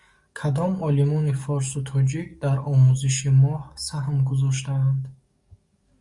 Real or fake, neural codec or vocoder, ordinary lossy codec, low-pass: fake; codec, 44.1 kHz, 7.8 kbps, DAC; Opus, 64 kbps; 10.8 kHz